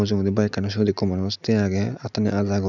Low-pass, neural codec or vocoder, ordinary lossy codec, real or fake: 7.2 kHz; none; none; real